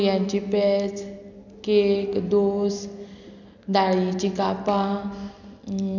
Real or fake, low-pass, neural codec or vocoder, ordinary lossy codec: real; 7.2 kHz; none; none